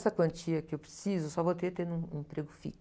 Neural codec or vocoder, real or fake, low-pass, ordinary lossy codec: none; real; none; none